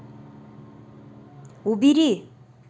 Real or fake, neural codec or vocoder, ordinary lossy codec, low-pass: real; none; none; none